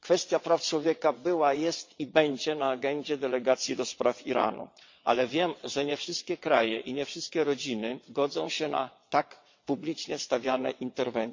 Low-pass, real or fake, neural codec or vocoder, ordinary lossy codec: 7.2 kHz; fake; vocoder, 22.05 kHz, 80 mel bands, WaveNeXt; MP3, 48 kbps